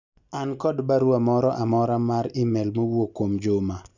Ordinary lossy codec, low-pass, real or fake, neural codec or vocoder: Opus, 64 kbps; 7.2 kHz; real; none